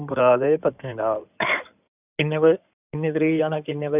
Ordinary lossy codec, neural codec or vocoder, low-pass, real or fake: none; codec, 16 kHz in and 24 kHz out, 2.2 kbps, FireRedTTS-2 codec; 3.6 kHz; fake